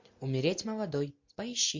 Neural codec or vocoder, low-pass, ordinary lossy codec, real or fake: none; 7.2 kHz; MP3, 48 kbps; real